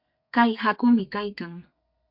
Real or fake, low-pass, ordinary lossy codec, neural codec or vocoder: fake; 5.4 kHz; MP3, 48 kbps; codec, 32 kHz, 1.9 kbps, SNAC